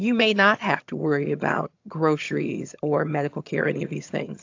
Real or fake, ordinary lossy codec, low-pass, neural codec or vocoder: fake; AAC, 48 kbps; 7.2 kHz; vocoder, 22.05 kHz, 80 mel bands, HiFi-GAN